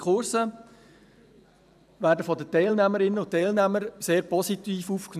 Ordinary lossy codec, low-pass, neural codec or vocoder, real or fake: none; 14.4 kHz; vocoder, 44.1 kHz, 128 mel bands every 512 samples, BigVGAN v2; fake